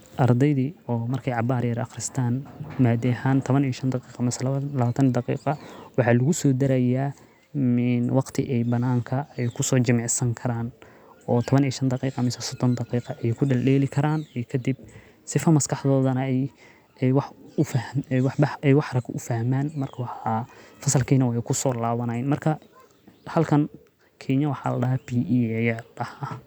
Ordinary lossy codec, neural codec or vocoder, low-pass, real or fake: none; none; none; real